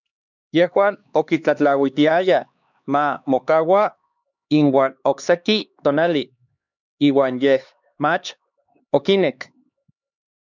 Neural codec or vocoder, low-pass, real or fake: codec, 16 kHz, 2 kbps, X-Codec, HuBERT features, trained on LibriSpeech; 7.2 kHz; fake